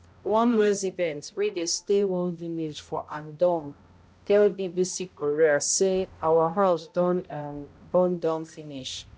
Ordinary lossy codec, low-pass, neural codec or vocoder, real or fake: none; none; codec, 16 kHz, 0.5 kbps, X-Codec, HuBERT features, trained on balanced general audio; fake